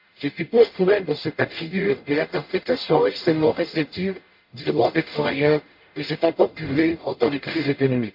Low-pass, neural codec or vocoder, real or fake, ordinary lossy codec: 5.4 kHz; codec, 44.1 kHz, 0.9 kbps, DAC; fake; MP3, 32 kbps